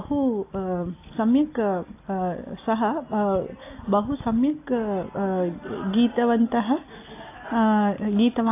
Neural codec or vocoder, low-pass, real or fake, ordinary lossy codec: none; 3.6 kHz; real; AAC, 24 kbps